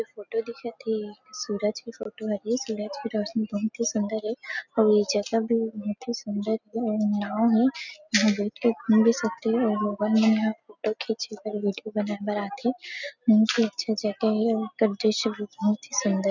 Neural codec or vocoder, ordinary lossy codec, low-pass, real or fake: none; none; 7.2 kHz; real